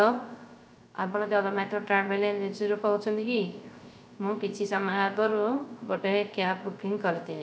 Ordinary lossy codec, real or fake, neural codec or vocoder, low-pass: none; fake; codec, 16 kHz, 0.3 kbps, FocalCodec; none